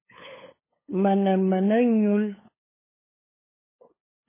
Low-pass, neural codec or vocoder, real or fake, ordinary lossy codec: 3.6 kHz; codec, 16 kHz, 8 kbps, FunCodec, trained on LibriTTS, 25 frames a second; fake; MP3, 16 kbps